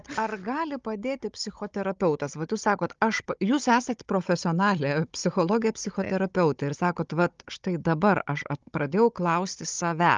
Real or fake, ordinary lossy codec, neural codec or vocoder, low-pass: fake; Opus, 24 kbps; codec, 16 kHz, 16 kbps, FunCodec, trained on Chinese and English, 50 frames a second; 7.2 kHz